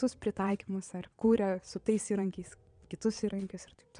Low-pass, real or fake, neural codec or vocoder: 9.9 kHz; fake; vocoder, 22.05 kHz, 80 mel bands, Vocos